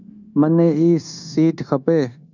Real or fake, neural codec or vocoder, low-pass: fake; codec, 16 kHz, 0.9 kbps, LongCat-Audio-Codec; 7.2 kHz